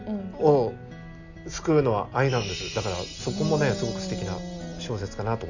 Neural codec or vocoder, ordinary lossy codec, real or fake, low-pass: none; none; real; 7.2 kHz